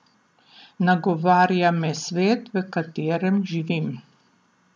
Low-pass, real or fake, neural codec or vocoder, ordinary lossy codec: none; real; none; none